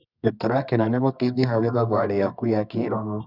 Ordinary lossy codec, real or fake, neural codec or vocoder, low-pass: none; fake; codec, 24 kHz, 0.9 kbps, WavTokenizer, medium music audio release; 5.4 kHz